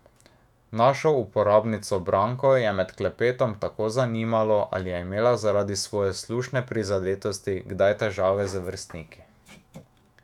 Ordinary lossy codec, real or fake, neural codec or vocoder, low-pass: none; fake; autoencoder, 48 kHz, 128 numbers a frame, DAC-VAE, trained on Japanese speech; 19.8 kHz